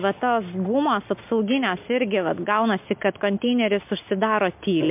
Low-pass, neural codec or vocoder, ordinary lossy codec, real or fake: 3.6 kHz; none; MP3, 32 kbps; real